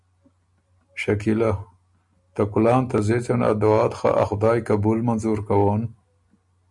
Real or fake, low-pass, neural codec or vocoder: real; 10.8 kHz; none